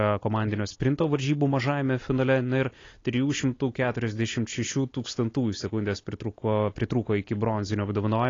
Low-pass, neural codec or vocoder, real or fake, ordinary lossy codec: 7.2 kHz; none; real; AAC, 32 kbps